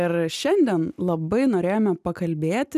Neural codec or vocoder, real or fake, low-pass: none; real; 14.4 kHz